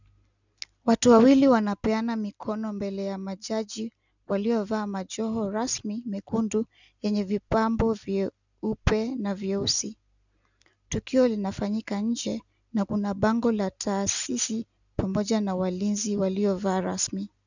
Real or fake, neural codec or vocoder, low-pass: real; none; 7.2 kHz